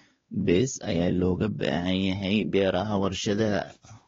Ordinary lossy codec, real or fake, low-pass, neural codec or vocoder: AAC, 24 kbps; fake; 7.2 kHz; codec, 16 kHz, 2 kbps, X-Codec, WavLM features, trained on Multilingual LibriSpeech